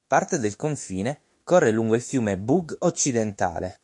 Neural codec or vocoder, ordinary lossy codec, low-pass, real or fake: autoencoder, 48 kHz, 32 numbers a frame, DAC-VAE, trained on Japanese speech; MP3, 48 kbps; 10.8 kHz; fake